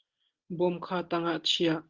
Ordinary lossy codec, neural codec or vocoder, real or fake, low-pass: Opus, 16 kbps; none; real; 7.2 kHz